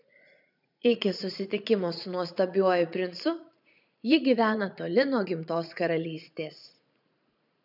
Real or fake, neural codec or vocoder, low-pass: fake; vocoder, 44.1 kHz, 80 mel bands, Vocos; 5.4 kHz